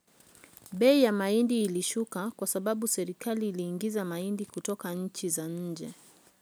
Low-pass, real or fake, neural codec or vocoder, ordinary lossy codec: none; real; none; none